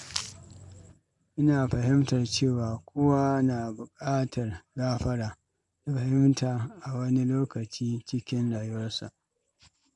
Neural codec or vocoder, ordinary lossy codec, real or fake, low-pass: none; MP3, 64 kbps; real; 10.8 kHz